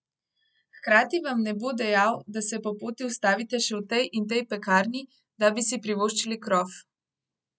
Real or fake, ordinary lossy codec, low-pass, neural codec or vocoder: real; none; none; none